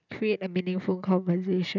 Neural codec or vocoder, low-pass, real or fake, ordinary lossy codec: none; 7.2 kHz; real; none